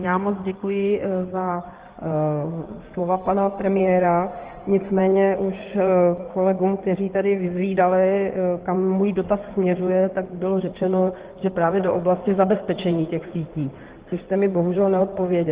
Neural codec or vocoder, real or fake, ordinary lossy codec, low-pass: codec, 16 kHz in and 24 kHz out, 2.2 kbps, FireRedTTS-2 codec; fake; Opus, 16 kbps; 3.6 kHz